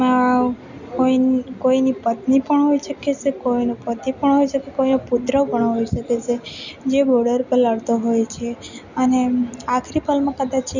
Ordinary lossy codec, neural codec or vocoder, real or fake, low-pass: none; none; real; 7.2 kHz